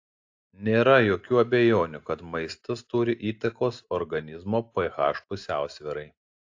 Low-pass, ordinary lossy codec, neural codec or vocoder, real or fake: 7.2 kHz; AAC, 48 kbps; none; real